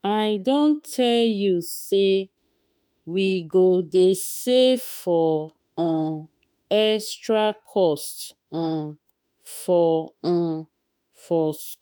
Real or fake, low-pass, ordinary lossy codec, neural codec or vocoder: fake; none; none; autoencoder, 48 kHz, 32 numbers a frame, DAC-VAE, trained on Japanese speech